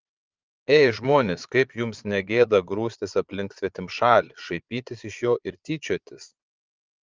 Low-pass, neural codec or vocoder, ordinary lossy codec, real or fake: 7.2 kHz; vocoder, 22.05 kHz, 80 mel bands, Vocos; Opus, 24 kbps; fake